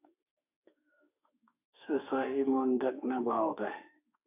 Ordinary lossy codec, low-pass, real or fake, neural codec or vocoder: AAC, 32 kbps; 3.6 kHz; fake; autoencoder, 48 kHz, 32 numbers a frame, DAC-VAE, trained on Japanese speech